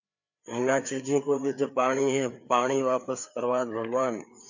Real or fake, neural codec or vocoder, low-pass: fake; codec, 16 kHz, 4 kbps, FreqCodec, larger model; 7.2 kHz